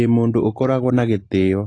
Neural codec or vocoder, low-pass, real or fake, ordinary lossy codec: none; 9.9 kHz; real; AAC, 32 kbps